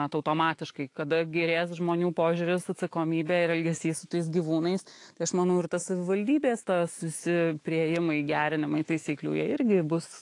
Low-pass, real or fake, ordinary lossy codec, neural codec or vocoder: 10.8 kHz; fake; AAC, 48 kbps; autoencoder, 48 kHz, 128 numbers a frame, DAC-VAE, trained on Japanese speech